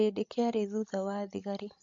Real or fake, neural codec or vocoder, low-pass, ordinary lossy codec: fake; codec, 16 kHz, 16 kbps, FreqCodec, larger model; 7.2 kHz; MP3, 48 kbps